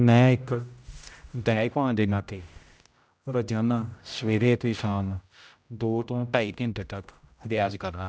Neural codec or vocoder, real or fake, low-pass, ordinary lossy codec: codec, 16 kHz, 0.5 kbps, X-Codec, HuBERT features, trained on general audio; fake; none; none